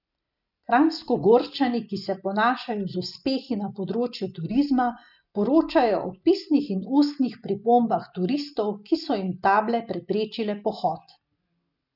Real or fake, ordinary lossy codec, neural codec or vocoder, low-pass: real; none; none; 5.4 kHz